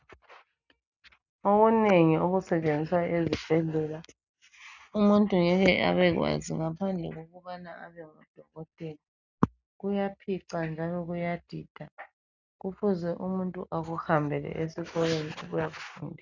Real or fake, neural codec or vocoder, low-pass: real; none; 7.2 kHz